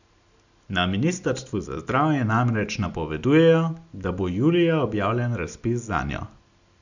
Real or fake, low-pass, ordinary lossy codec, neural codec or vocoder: real; 7.2 kHz; none; none